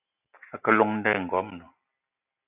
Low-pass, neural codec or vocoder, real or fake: 3.6 kHz; none; real